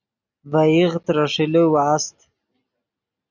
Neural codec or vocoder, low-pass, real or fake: none; 7.2 kHz; real